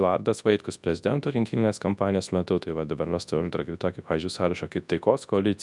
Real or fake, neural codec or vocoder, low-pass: fake; codec, 24 kHz, 0.9 kbps, WavTokenizer, large speech release; 10.8 kHz